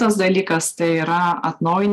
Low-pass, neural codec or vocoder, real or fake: 14.4 kHz; none; real